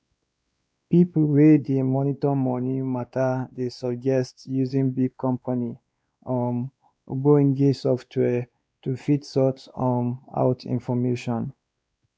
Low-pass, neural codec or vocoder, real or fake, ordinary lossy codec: none; codec, 16 kHz, 2 kbps, X-Codec, WavLM features, trained on Multilingual LibriSpeech; fake; none